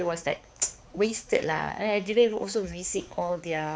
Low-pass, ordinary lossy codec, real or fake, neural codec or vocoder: none; none; fake; codec, 16 kHz, 4 kbps, X-Codec, HuBERT features, trained on balanced general audio